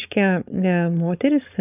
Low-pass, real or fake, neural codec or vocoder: 3.6 kHz; fake; codec, 16 kHz, 4.8 kbps, FACodec